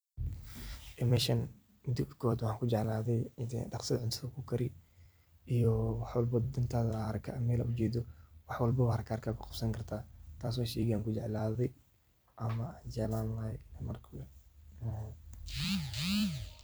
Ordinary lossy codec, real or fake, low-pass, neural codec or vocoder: none; fake; none; vocoder, 44.1 kHz, 128 mel bands every 256 samples, BigVGAN v2